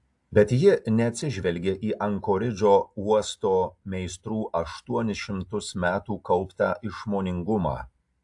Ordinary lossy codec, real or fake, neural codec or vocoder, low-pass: AAC, 64 kbps; real; none; 10.8 kHz